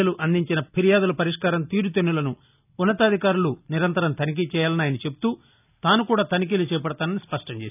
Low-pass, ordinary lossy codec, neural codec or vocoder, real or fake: 3.6 kHz; none; none; real